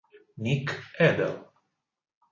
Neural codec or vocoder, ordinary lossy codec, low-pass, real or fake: none; MP3, 32 kbps; 7.2 kHz; real